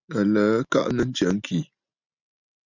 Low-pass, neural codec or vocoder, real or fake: 7.2 kHz; none; real